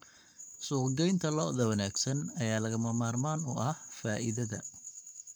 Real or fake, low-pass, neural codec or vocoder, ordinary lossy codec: fake; none; codec, 44.1 kHz, 7.8 kbps, Pupu-Codec; none